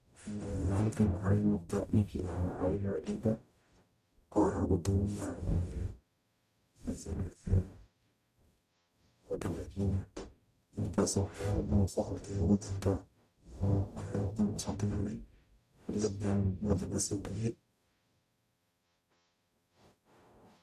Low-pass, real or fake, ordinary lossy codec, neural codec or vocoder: 14.4 kHz; fake; none; codec, 44.1 kHz, 0.9 kbps, DAC